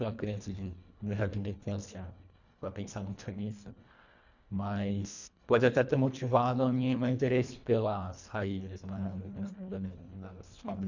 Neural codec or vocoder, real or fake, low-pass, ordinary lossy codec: codec, 24 kHz, 1.5 kbps, HILCodec; fake; 7.2 kHz; none